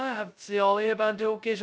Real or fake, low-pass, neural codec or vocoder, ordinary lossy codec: fake; none; codec, 16 kHz, 0.2 kbps, FocalCodec; none